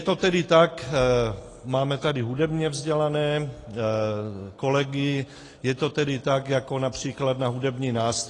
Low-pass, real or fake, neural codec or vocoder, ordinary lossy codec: 10.8 kHz; real; none; AAC, 32 kbps